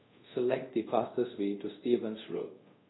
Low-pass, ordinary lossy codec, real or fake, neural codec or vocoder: 7.2 kHz; AAC, 16 kbps; fake; codec, 24 kHz, 0.5 kbps, DualCodec